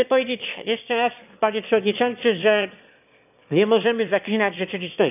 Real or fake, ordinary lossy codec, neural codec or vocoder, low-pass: fake; none; autoencoder, 22.05 kHz, a latent of 192 numbers a frame, VITS, trained on one speaker; 3.6 kHz